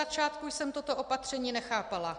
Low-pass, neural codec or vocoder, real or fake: 9.9 kHz; none; real